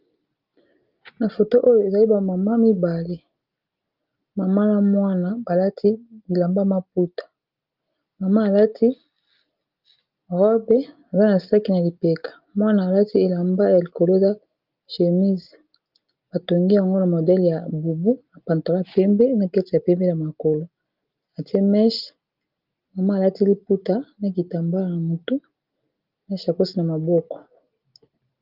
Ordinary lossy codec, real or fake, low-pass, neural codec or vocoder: Opus, 32 kbps; real; 5.4 kHz; none